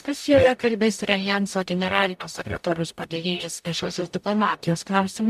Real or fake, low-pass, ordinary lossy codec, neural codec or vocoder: fake; 14.4 kHz; MP3, 96 kbps; codec, 44.1 kHz, 0.9 kbps, DAC